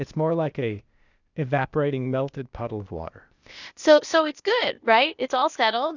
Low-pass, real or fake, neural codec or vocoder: 7.2 kHz; fake; codec, 16 kHz, 0.8 kbps, ZipCodec